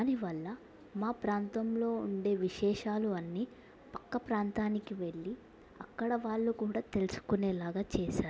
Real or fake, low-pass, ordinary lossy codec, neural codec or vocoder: real; none; none; none